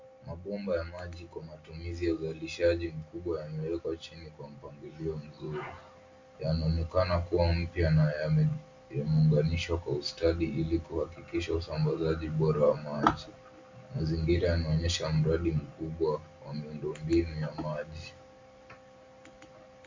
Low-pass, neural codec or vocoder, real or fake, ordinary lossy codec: 7.2 kHz; none; real; MP3, 64 kbps